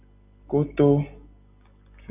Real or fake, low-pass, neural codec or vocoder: real; 3.6 kHz; none